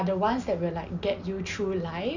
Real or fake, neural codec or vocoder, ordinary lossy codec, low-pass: real; none; none; 7.2 kHz